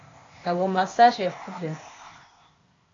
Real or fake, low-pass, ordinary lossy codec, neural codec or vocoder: fake; 7.2 kHz; AAC, 32 kbps; codec, 16 kHz, 0.8 kbps, ZipCodec